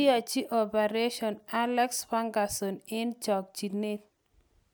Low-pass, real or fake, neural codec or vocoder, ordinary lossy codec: none; real; none; none